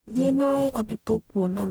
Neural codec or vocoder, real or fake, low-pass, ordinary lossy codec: codec, 44.1 kHz, 0.9 kbps, DAC; fake; none; none